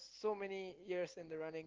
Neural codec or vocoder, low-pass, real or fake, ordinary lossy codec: none; 7.2 kHz; real; Opus, 16 kbps